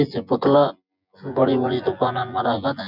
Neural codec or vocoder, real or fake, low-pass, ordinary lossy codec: vocoder, 24 kHz, 100 mel bands, Vocos; fake; 5.4 kHz; none